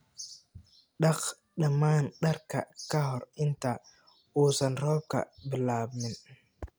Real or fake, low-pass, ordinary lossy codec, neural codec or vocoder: real; none; none; none